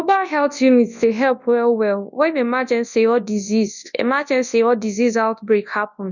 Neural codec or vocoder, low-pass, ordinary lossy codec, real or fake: codec, 24 kHz, 0.9 kbps, WavTokenizer, large speech release; 7.2 kHz; none; fake